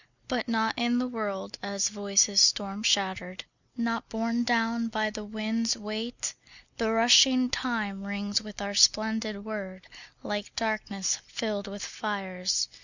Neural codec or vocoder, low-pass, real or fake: none; 7.2 kHz; real